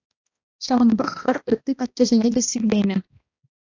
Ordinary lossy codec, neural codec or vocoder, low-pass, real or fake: AAC, 48 kbps; codec, 16 kHz, 1 kbps, X-Codec, HuBERT features, trained on balanced general audio; 7.2 kHz; fake